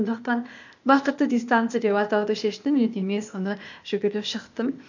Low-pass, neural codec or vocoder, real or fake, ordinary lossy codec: 7.2 kHz; codec, 16 kHz, 0.8 kbps, ZipCodec; fake; none